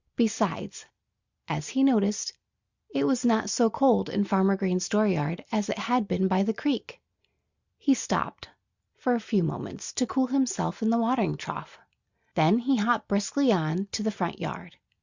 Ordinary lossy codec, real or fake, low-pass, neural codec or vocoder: Opus, 64 kbps; real; 7.2 kHz; none